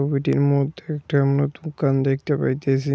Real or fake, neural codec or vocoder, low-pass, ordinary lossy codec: real; none; none; none